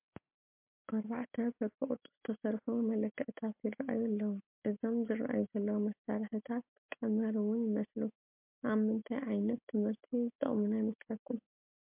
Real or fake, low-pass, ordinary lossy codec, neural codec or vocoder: real; 3.6 kHz; MP3, 32 kbps; none